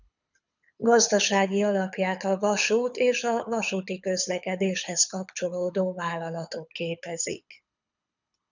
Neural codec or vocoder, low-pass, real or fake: codec, 24 kHz, 6 kbps, HILCodec; 7.2 kHz; fake